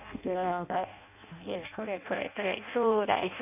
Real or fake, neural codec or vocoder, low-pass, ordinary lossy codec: fake; codec, 16 kHz in and 24 kHz out, 0.6 kbps, FireRedTTS-2 codec; 3.6 kHz; none